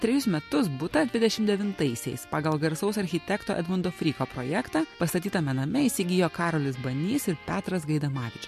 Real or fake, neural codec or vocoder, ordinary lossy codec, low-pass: real; none; MP3, 64 kbps; 14.4 kHz